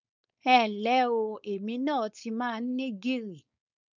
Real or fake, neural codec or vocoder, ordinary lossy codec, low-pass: fake; codec, 16 kHz, 4.8 kbps, FACodec; none; 7.2 kHz